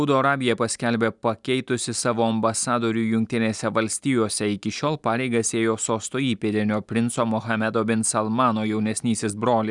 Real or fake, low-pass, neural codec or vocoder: real; 10.8 kHz; none